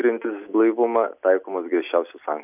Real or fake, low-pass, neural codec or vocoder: real; 3.6 kHz; none